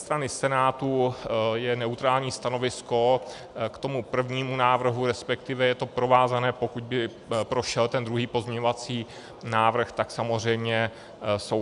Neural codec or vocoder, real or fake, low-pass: none; real; 10.8 kHz